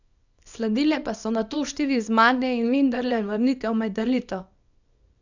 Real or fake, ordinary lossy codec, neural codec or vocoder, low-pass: fake; none; codec, 24 kHz, 0.9 kbps, WavTokenizer, small release; 7.2 kHz